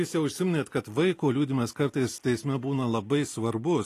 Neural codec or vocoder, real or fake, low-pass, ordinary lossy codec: none; real; 14.4 kHz; AAC, 48 kbps